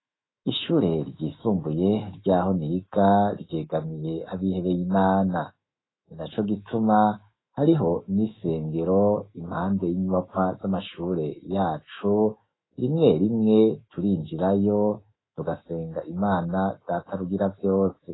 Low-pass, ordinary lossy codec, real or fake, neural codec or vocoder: 7.2 kHz; AAC, 16 kbps; fake; autoencoder, 48 kHz, 128 numbers a frame, DAC-VAE, trained on Japanese speech